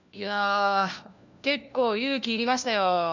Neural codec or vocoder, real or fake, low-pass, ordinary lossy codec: codec, 16 kHz, 1 kbps, FunCodec, trained on LibriTTS, 50 frames a second; fake; 7.2 kHz; none